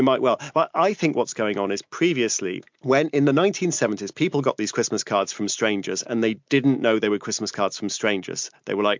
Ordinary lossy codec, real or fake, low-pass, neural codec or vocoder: MP3, 64 kbps; real; 7.2 kHz; none